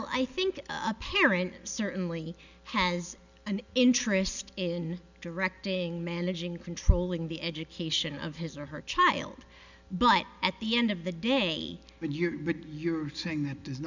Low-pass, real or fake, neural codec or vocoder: 7.2 kHz; real; none